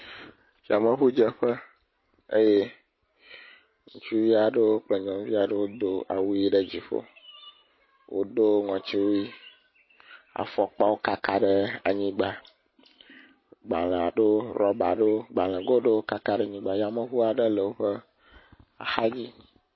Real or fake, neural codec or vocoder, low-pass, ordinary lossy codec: real; none; 7.2 kHz; MP3, 24 kbps